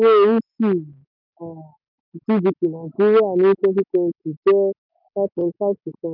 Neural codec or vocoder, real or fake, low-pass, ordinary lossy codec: none; real; 5.4 kHz; none